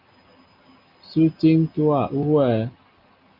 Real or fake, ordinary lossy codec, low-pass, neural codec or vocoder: real; Opus, 32 kbps; 5.4 kHz; none